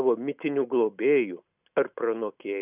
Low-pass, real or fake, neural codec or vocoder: 3.6 kHz; real; none